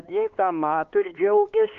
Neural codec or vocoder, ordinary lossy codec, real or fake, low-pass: codec, 16 kHz, 2 kbps, X-Codec, HuBERT features, trained on LibriSpeech; Opus, 24 kbps; fake; 7.2 kHz